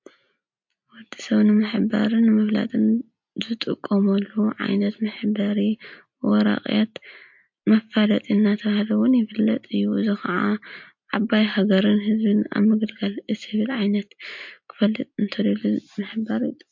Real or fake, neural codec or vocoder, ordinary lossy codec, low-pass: real; none; MP3, 48 kbps; 7.2 kHz